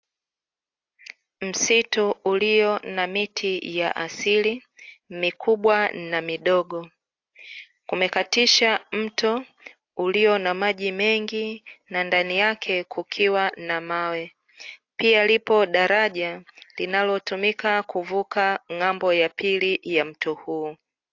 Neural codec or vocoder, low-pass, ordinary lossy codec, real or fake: none; 7.2 kHz; AAC, 48 kbps; real